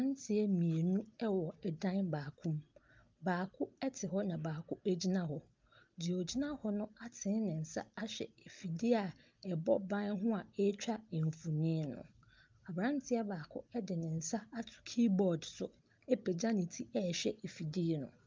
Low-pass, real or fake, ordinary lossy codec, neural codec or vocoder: 7.2 kHz; real; Opus, 24 kbps; none